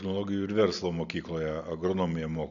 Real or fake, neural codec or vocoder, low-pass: real; none; 7.2 kHz